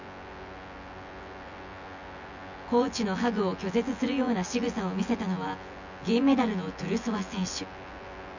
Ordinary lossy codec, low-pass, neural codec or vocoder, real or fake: none; 7.2 kHz; vocoder, 24 kHz, 100 mel bands, Vocos; fake